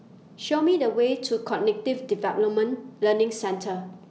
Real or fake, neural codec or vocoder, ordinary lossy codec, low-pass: real; none; none; none